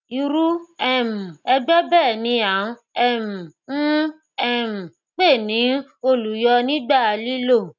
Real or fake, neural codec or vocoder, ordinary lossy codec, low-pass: real; none; none; 7.2 kHz